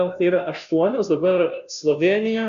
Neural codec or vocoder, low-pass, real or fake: codec, 16 kHz, 0.5 kbps, FunCodec, trained on Chinese and English, 25 frames a second; 7.2 kHz; fake